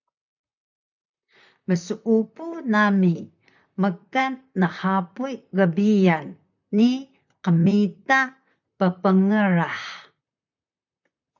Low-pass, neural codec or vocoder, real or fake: 7.2 kHz; vocoder, 44.1 kHz, 128 mel bands, Pupu-Vocoder; fake